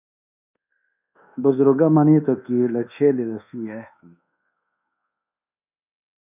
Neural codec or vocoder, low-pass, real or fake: codec, 24 kHz, 1.2 kbps, DualCodec; 3.6 kHz; fake